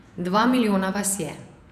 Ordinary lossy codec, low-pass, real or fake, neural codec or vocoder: none; 14.4 kHz; fake; vocoder, 48 kHz, 128 mel bands, Vocos